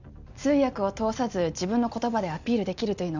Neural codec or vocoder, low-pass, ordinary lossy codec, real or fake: none; 7.2 kHz; none; real